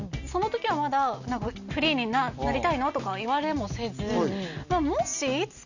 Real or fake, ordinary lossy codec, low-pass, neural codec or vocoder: real; none; 7.2 kHz; none